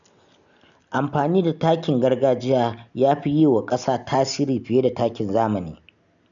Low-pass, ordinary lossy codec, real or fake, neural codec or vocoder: 7.2 kHz; none; real; none